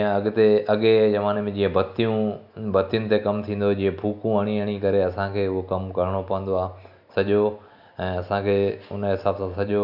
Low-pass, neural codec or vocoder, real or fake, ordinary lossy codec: 5.4 kHz; none; real; none